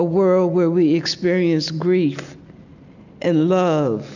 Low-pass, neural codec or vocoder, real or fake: 7.2 kHz; none; real